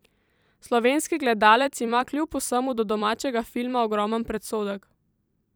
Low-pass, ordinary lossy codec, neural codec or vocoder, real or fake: none; none; vocoder, 44.1 kHz, 128 mel bands every 256 samples, BigVGAN v2; fake